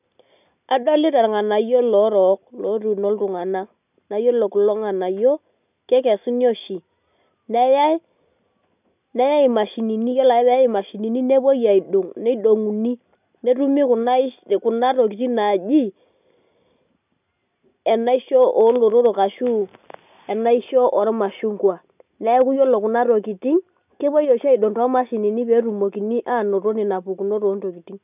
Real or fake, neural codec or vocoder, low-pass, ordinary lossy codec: real; none; 3.6 kHz; none